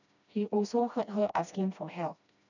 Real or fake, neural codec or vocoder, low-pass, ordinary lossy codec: fake; codec, 16 kHz, 1 kbps, FreqCodec, smaller model; 7.2 kHz; none